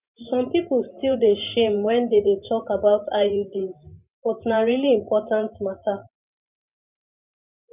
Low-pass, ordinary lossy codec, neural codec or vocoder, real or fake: 3.6 kHz; none; vocoder, 44.1 kHz, 128 mel bands every 512 samples, BigVGAN v2; fake